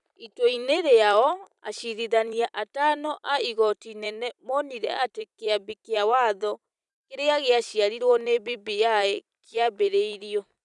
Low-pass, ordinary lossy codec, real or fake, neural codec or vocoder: 10.8 kHz; none; fake; vocoder, 44.1 kHz, 128 mel bands every 256 samples, BigVGAN v2